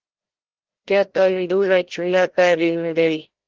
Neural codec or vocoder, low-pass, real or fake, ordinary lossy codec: codec, 16 kHz, 0.5 kbps, FreqCodec, larger model; 7.2 kHz; fake; Opus, 16 kbps